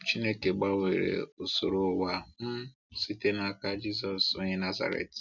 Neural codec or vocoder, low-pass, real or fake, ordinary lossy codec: none; 7.2 kHz; real; none